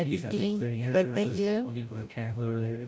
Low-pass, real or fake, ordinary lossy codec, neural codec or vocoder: none; fake; none; codec, 16 kHz, 0.5 kbps, FreqCodec, larger model